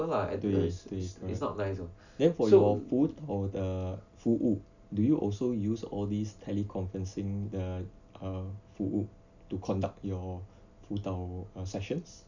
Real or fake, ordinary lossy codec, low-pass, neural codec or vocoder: real; none; 7.2 kHz; none